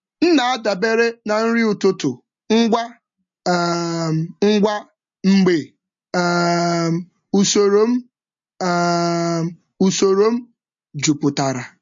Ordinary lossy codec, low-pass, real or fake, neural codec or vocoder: MP3, 48 kbps; 7.2 kHz; real; none